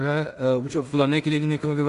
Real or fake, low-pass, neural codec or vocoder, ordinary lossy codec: fake; 10.8 kHz; codec, 16 kHz in and 24 kHz out, 0.4 kbps, LongCat-Audio-Codec, two codebook decoder; Opus, 64 kbps